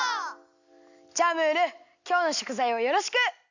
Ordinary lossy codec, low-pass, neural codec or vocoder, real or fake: none; 7.2 kHz; none; real